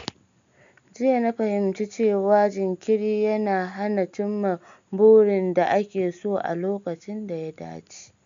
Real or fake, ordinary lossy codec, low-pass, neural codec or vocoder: real; MP3, 64 kbps; 7.2 kHz; none